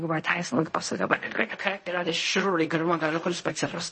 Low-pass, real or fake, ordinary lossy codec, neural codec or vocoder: 10.8 kHz; fake; MP3, 32 kbps; codec, 16 kHz in and 24 kHz out, 0.4 kbps, LongCat-Audio-Codec, fine tuned four codebook decoder